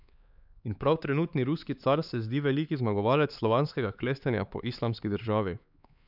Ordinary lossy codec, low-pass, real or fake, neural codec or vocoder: none; 5.4 kHz; fake; codec, 16 kHz, 4 kbps, X-Codec, HuBERT features, trained on LibriSpeech